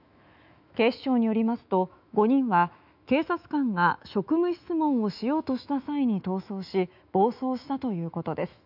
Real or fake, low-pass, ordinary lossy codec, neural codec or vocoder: fake; 5.4 kHz; none; autoencoder, 48 kHz, 128 numbers a frame, DAC-VAE, trained on Japanese speech